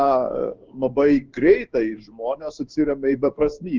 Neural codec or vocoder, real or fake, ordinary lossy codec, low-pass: codec, 16 kHz in and 24 kHz out, 1 kbps, XY-Tokenizer; fake; Opus, 32 kbps; 7.2 kHz